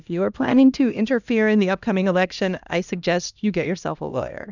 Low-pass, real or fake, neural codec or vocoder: 7.2 kHz; fake; codec, 16 kHz, 1 kbps, X-Codec, HuBERT features, trained on LibriSpeech